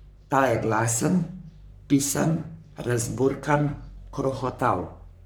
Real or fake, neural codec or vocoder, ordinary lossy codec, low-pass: fake; codec, 44.1 kHz, 3.4 kbps, Pupu-Codec; none; none